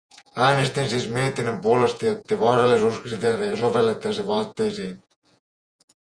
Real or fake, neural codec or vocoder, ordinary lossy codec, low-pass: fake; vocoder, 48 kHz, 128 mel bands, Vocos; Opus, 64 kbps; 9.9 kHz